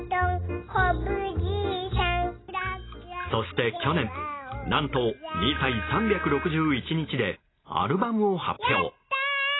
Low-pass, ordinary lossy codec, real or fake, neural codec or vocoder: 7.2 kHz; AAC, 16 kbps; real; none